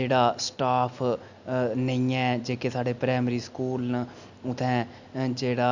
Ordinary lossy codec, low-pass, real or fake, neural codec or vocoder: none; 7.2 kHz; real; none